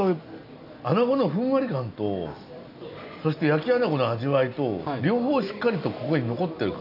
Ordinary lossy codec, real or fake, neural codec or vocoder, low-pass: none; real; none; 5.4 kHz